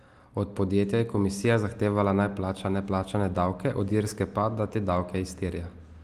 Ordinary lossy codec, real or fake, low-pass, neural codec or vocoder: Opus, 24 kbps; real; 14.4 kHz; none